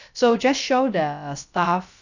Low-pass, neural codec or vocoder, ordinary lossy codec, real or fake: 7.2 kHz; codec, 16 kHz, about 1 kbps, DyCAST, with the encoder's durations; none; fake